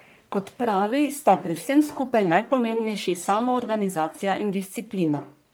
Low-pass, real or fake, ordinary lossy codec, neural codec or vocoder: none; fake; none; codec, 44.1 kHz, 1.7 kbps, Pupu-Codec